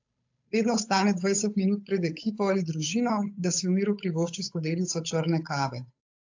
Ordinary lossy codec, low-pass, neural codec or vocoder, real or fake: AAC, 48 kbps; 7.2 kHz; codec, 16 kHz, 8 kbps, FunCodec, trained on Chinese and English, 25 frames a second; fake